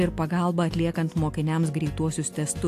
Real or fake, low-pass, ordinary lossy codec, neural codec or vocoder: real; 14.4 kHz; AAC, 64 kbps; none